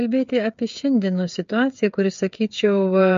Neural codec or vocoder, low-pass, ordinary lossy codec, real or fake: codec, 16 kHz, 8 kbps, FreqCodec, smaller model; 7.2 kHz; MP3, 48 kbps; fake